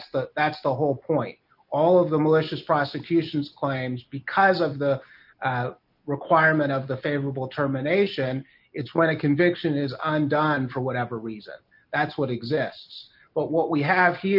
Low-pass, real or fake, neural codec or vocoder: 5.4 kHz; real; none